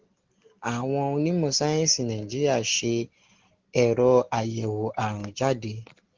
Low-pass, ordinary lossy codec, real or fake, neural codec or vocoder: 7.2 kHz; Opus, 16 kbps; real; none